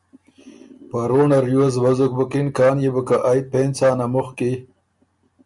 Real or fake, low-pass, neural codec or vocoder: real; 10.8 kHz; none